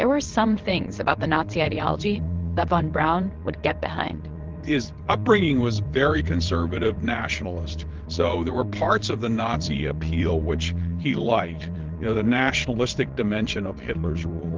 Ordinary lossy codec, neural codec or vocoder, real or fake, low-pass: Opus, 16 kbps; vocoder, 22.05 kHz, 80 mel bands, WaveNeXt; fake; 7.2 kHz